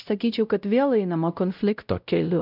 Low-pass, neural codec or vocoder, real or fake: 5.4 kHz; codec, 16 kHz, 0.5 kbps, X-Codec, WavLM features, trained on Multilingual LibriSpeech; fake